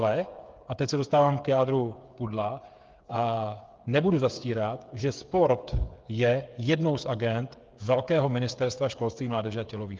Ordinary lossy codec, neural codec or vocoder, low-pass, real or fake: Opus, 32 kbps; codec, 16 kHz, 8 kbps, FreqCodec, smaller model; 7.2 kHz; fake